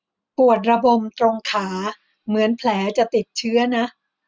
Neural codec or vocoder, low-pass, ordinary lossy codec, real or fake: none; none; none; real